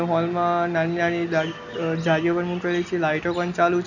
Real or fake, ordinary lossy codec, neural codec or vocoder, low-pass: real; none; none; 7.2 kHz